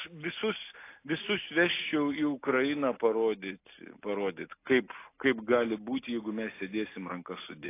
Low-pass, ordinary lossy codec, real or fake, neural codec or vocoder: 3.6 kHz; AAC, 24 kbps; real; none